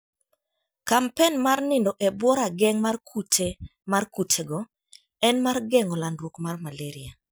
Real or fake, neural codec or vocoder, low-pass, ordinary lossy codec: real; none; none; none